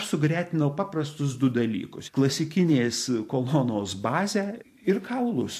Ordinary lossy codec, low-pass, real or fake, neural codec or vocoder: MP3, 64 kbps; 14.4 kHz; fake; vocoder, 44.1 kHz, 128 mel bands every 512 samples, BigVGAN v2